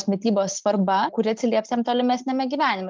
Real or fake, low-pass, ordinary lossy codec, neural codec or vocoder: real; 7.2 kHz; Opus, 24 kbps; none